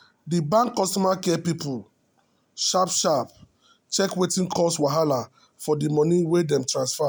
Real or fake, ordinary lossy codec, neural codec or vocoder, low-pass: real; none; none; none